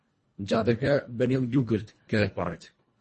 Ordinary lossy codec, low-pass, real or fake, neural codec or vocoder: MP3, 32 kbps; 10.8 kHz; fake; codec, 24 kHz, 1.5 kbps, HILCodec